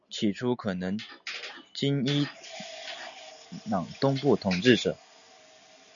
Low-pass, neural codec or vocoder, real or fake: 7.2 kHz; none; real